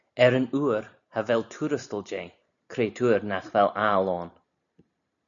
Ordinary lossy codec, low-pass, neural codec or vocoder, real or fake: MP3, 96 kbps; 7.2 kHz; none; real